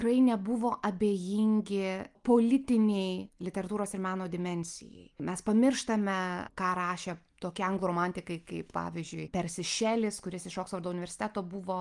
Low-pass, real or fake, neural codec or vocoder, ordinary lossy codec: 10.8 kHz; real; none; Opus, 32 kbps